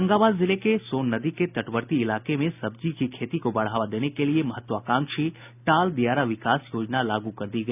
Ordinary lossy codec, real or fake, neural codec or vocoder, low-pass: none; real; none; 3.6 kHz